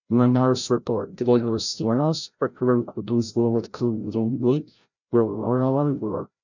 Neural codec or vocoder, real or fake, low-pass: codec, 16 kHz, 0.5 kbps, FreqCodec, larger model; fake; 7.2 kHz